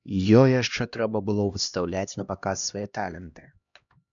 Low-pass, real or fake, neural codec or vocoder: 7.2 kHz; fake; codec, 16 kHz, 1 kbps, X-Codec, HuBERT features, trained on LibriSpeech